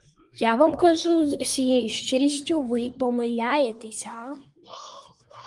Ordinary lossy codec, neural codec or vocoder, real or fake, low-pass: Opus, 32 kbps; codec, 24 kHz, 1 kbps, SNAC; fake; 10.8 kHz